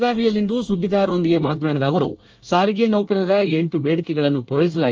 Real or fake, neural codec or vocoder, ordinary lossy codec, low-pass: fake; codec, 24 kHz, 1 kbps, SNAC; Opus, 24 kbps; 7.2 kHz